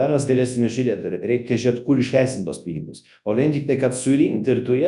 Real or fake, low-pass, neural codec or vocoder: fake; 10.8 kHz; codec, 24 kHz, 0.9 kbps, WavTokenizer, large speech release